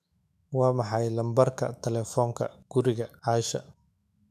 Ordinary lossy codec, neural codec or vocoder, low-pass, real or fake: none; autoencoder, 48 kHz, 128 numbers a frame, DAC-VAE, trained on Japanese speech; 14.4 kHz; fake